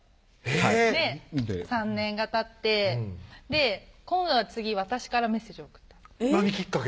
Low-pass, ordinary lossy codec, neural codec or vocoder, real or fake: none; none; none; real